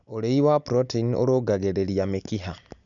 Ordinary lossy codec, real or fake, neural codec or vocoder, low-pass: none; real; none; 7.2 kHz